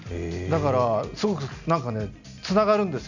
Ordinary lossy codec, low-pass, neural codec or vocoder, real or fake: none; 7.2 kHz; none; real